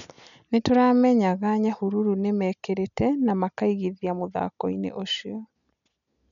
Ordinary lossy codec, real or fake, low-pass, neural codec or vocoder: none; real; 7.2 kHz; none